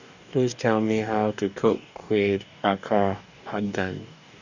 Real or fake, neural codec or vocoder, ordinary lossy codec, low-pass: fake; codec, 44.1 kHz, 2.6 kbps, DAC; none; 7.2 kHz